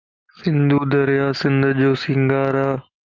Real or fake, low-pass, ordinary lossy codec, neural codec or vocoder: real; 7.2 kHz; Opus, 24 kbps; none